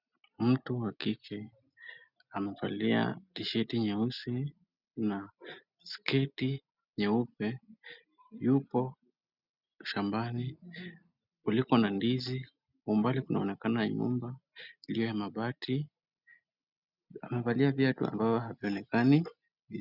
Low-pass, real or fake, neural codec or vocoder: 5.4 kHz; real; none